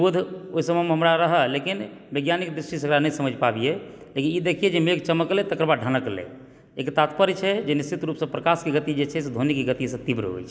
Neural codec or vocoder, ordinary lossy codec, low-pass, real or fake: none; none; none; real